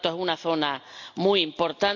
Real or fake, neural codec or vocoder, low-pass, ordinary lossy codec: real; none; 7.2 kHz; none